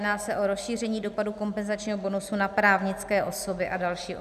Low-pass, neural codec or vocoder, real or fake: 14.4 kHz; none; real